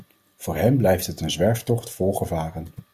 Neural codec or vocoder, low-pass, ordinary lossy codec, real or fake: none; 14.4 kHz; Opus, 64 kbps; real